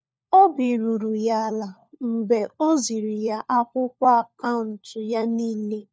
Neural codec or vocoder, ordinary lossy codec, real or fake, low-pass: codec, 16 kHz, 4 kbps, FunCodec, trained on LibriTTS, 50 frames a second; none; fake; none